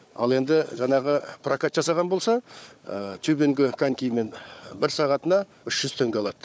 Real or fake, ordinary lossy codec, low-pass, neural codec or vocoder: fake; none; none; codec, 16 kHz, 4 kbps, FunCodec, trained on Chinese and English, 50 frames a second